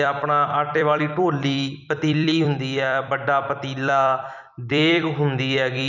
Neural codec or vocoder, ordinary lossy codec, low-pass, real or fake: vocoder, 44.1 kHz, 128 mel bands every 256 samples, BigVGAN v2; none; 7.2 kHz; fake